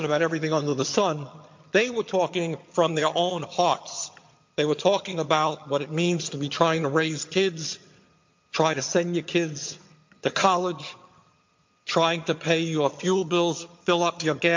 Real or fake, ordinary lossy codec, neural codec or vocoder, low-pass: fake; MP3, 48 kbps; vocoder, 22.05 kHz, 80 mel bands, HiFi-GAN; 7.2 kHz